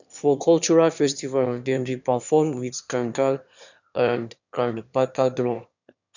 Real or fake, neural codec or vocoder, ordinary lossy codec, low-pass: fake; autoencoder, 22.05 kHz, a latent of 192 numbers a frame, VITS, trained on one speaker; none; 7.2 kHz